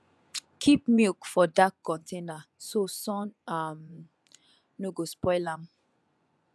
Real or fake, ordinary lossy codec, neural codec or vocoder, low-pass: fake; none; vocoder, 24 kHz, 100 mel bands, Vocos; none